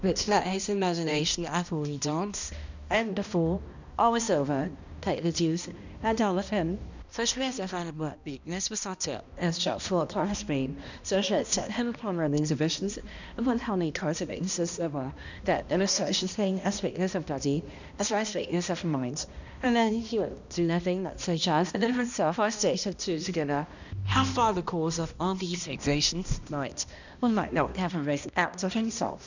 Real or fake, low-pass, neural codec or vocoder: fake; 7.2 kHz; codec, 16 kHz, 1 kbps, X-Codec, HuBERT features, trained on balanced general audio